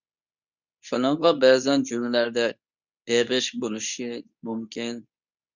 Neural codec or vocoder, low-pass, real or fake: codec, 24 kHz, 0.9 kbps, WavTokenizer, medium speech release version 2; 7.2 kHz; fake